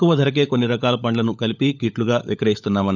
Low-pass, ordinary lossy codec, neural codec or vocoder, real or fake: 7.2 kHz; none; codec, 16 kHz, 16 kbps, FunCodec, trained on LibriTTS, 50 frames a second; fake